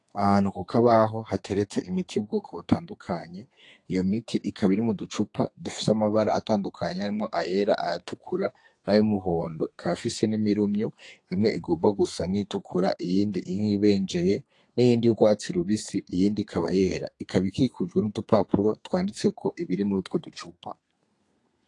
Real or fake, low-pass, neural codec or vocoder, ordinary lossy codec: fake; 10.8 kHz; codec, 32 kHz, 1.9 kbps, SNAC; AAC, 48 kbps